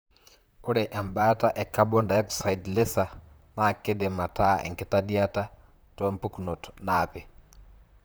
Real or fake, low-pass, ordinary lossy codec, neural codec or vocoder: fake; none; none; vocoder, 44.1 kHz, 128 mel bands, Pupu-Vocoder